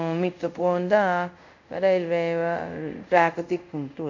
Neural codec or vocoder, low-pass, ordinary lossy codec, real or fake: codec, 24 kHz, 0.5 kbps, DualCodec; 7.2 kHz; none; fake